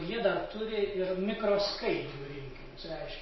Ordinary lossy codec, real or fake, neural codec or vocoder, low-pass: MP3, 24 kbps; real; none; 7.2 kHz